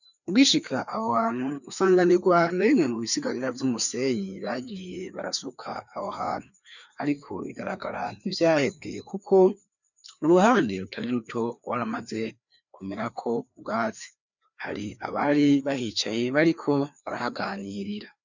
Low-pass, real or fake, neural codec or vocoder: 7.2 kHz; fake; codec, 16 kHz, 2 kbps, FreqCodec, larger model